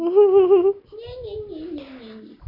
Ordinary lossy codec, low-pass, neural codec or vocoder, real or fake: none; 5.4 kHz; none; real